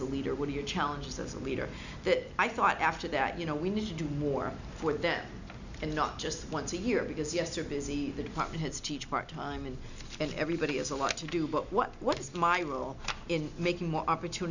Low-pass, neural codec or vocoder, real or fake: 7.2 kHz; none; real